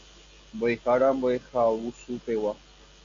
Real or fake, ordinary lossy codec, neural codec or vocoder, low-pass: real; MP3, 64 kbps; none; 7.2 kHz